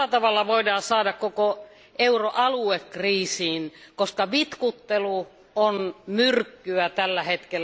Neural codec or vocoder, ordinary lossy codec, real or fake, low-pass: none; none; real; none